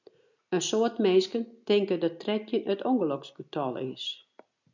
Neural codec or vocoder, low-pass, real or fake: none; 7.2 kHz; real